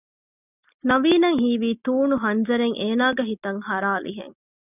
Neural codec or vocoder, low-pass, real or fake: none; 3.6 kHz; real